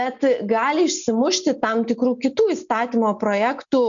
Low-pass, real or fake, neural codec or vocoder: 7.2 kHz; real; none